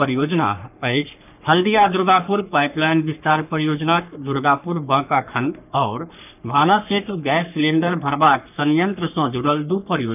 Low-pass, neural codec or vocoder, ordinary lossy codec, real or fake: 3.6 kHz; codec, 44.1 kHz, 3.4 kbps, Pupu-Codec; none; fake